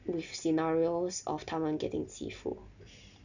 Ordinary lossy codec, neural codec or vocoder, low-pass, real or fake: none; none; 7.2 kHz; real